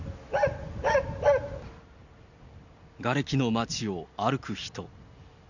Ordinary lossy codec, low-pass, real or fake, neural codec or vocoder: none; 7.2 kHz; real; none